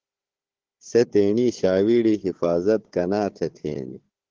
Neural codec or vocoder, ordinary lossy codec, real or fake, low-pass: codec, 16 kHz, 4 kbps, FunCodec, trained on Chinese and English, 50 frames a second; Opus, 16 kbps; fake; 7.2 kHz